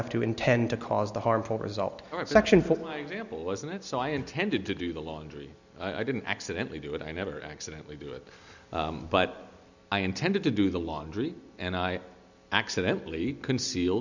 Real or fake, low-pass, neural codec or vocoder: real; 7.2 kHz; none